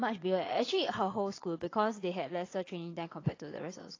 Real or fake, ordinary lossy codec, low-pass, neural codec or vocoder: fake; AAC, 32 kbps; 7.2 kHz; vocoder, 22.05 kHz, 80 mel bands, Vocos